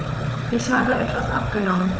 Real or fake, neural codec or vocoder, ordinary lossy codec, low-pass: fake; codec, 16 kHz, 4 kbps, FunCodec, trained on Chinese and English, 50 frames a second; none; none